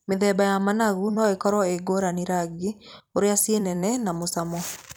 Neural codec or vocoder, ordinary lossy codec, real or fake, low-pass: vocoder, 44.1 kHz, 128 mel bands every 256 samples, BigVGAN v2; none; fake; none